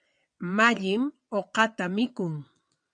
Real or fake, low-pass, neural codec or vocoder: fake; 9.9 kHz; vocoder, 22.05 kHz, 80 mel bands, WaveNeXt